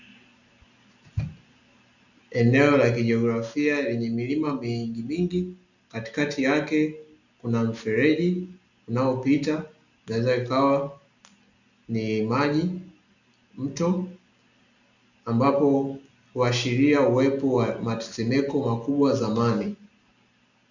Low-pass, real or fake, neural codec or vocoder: 7.2 kHz; real; none